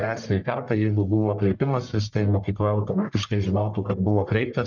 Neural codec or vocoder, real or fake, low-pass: codec, 44.1 kHz, 1.7 kbps, Pupu-Codec; fake; 7.2 kHz